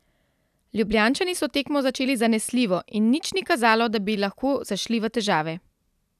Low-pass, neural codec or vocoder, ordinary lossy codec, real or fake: 14.4 kHz; none; none; real